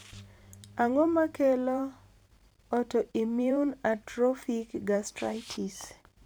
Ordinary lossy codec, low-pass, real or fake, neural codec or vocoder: none; none; fake; vocoder, 44.1 kHz, 128 mel bands every 512 samples, BigVGAN v2